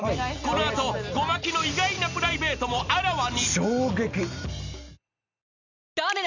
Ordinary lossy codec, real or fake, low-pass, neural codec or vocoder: none; real; 7.2 kHz; none